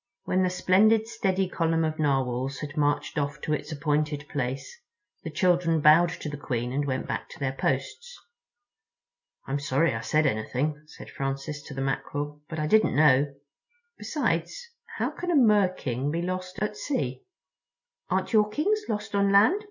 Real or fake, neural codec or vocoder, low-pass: real; none; 7.2 kHz